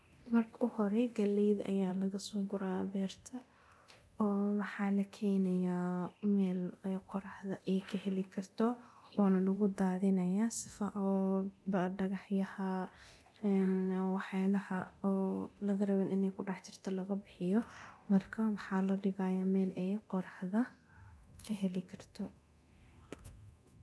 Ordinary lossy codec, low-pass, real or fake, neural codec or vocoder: none; none; fake; codec, 24 kHz, 0.9 kbps, DualCodec